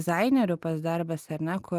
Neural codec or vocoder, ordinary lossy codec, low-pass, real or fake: none; Opus, 32 kbps; 14.4 kHz; real